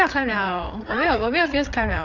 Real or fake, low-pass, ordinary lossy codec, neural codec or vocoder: fake; 7.2 kHz; none; codec, 16 kHz, 8 kbps, FreqCodec, larger model